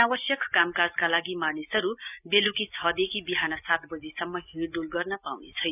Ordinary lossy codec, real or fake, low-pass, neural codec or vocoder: none; real; 3.6 kHz; none